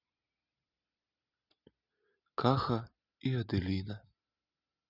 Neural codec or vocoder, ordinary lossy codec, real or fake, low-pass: none; none; real; 5.4 kHz